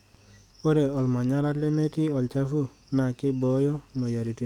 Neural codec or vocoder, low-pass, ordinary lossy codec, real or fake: codec, 44.1 kHz, 7.8 kbps, DAC; 19.8 kHz; none; fake